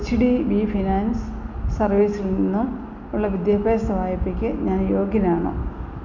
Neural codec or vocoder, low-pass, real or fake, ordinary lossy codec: none; 7.2 kHz; real; none